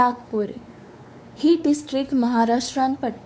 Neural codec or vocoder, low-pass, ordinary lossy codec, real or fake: codec, 16 kHz, 4 kbps, X-Codec, WavLM features, trained on Multilingual LibriSpeech; none; none; fake